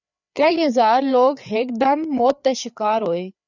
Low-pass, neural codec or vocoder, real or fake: 7.2 kHz; codec, 16 kHz, 4 kbps, FreqCodec, larger model; fake